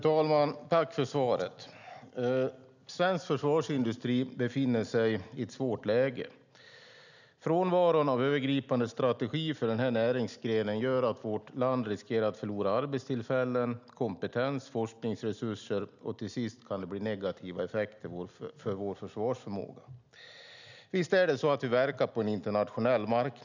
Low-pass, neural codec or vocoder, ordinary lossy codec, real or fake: 7.2 kHz; none; none; real